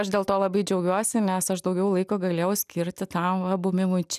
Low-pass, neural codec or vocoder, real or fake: 14.4 kHz; none; real